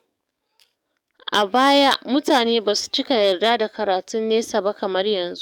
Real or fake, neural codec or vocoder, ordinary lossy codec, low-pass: fake; codec, 44.1 kHz, 7.8 kbps, DAC; none; 19.8 kHz